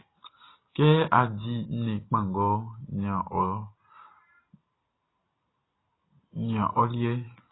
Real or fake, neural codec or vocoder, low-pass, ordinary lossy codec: real; none; 7.2 kHz; AAC, 16 kbps